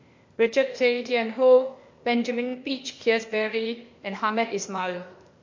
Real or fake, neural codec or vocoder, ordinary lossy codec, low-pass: fake; codec, 16 kHz, 0.8 kbps, ZipCodec; MP3, 48 kbps; 7.2 kHz